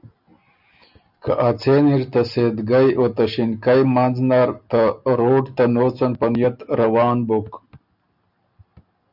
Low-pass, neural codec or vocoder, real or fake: 5.4 kHz; none; real